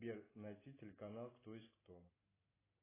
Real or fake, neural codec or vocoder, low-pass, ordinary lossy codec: real; none; 3.6 kHz; AAC, 16 kbps